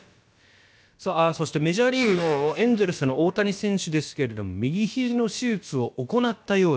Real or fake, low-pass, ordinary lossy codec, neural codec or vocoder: fake; none; none; codec, 16 kHz, about 1 kbps, DyCAST, with the encoder's durations